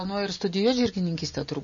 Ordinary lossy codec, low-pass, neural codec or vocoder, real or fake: MP3, 32 kbps; 7.2 kHz; none; real